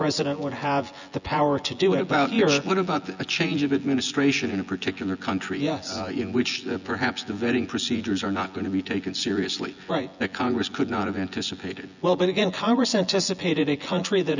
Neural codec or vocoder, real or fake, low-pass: vocoder, 24 kHz, 100 mel bands, Vocos; fake; 7.2 kHz